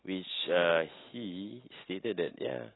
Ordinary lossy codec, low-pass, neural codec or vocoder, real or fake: AAC, 16 kbps; 7.2 kHz; none; real